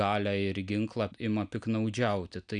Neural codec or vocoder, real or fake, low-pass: none; real; 9.9 kHz